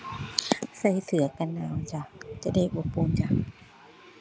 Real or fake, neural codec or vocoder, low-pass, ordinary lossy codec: real; none; none; none